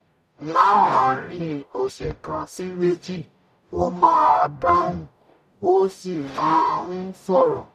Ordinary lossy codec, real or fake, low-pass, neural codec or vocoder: none; fake; 14.4 kHz; codec, 44.1 kHz, 0.9 kbps, DAC